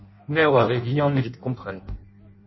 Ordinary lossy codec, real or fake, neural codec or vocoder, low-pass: MP3, 24 kbps; fake; codec, 16 kHz in and 24 kHz out, 0.6 kbps, FireRedTTS-2 codec; 7.2 kHz